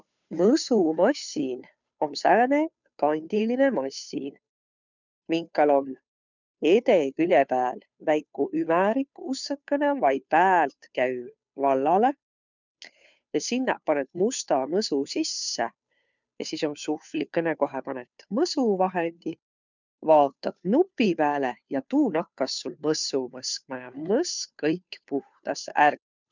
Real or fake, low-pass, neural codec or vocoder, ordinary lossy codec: fake; 7.2 kHz; codec, 16 kHz, 2 kbps, FunCodec, trained on Chinese and English, 25 frames a second; none